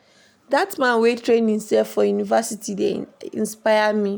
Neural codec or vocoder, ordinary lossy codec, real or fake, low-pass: none; none; real; none